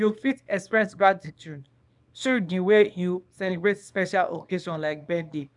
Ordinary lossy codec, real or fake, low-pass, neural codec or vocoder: none; fake; 10.8 kHz; codec, 24 kHz, 0.9 kbps, WavTokenizer, small release